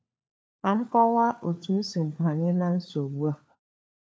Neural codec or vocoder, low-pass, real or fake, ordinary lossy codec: codec, 16 kHz, 4 kbps, FunCodec, trained on LibriTTS, 50 frames a second; none; fake; none